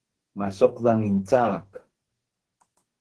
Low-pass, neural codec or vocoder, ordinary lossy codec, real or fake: 10.8 kHz; codec, 44.1 kHz, 2.6 kbps, DAC; Opus, 16 kbps; fake